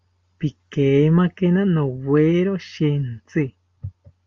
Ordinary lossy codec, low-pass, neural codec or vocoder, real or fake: Opus, 32 kbps; 7.2 kHz; none; real